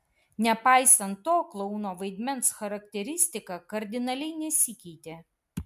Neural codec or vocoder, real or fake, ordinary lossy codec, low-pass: none; real; MP3, 96 kbps; 14.4 kHz